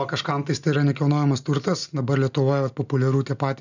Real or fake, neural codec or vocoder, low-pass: real; none; 7.2 kHz